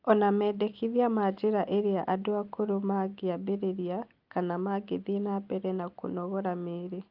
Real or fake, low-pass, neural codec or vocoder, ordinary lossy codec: real; 5.4 kHz; none; Opus, 24 kbps